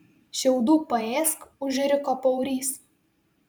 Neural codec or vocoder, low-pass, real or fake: vocoder, 44.1 kHz, 128 mel bands every 512 samples, BigVGAN v2; 19.8 kHz; fake